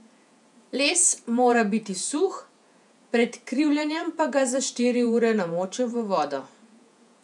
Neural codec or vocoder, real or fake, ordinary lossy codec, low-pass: vocoder, 48 kHz, 128 mel bands, Vocos; fake; none; 10.8 kHz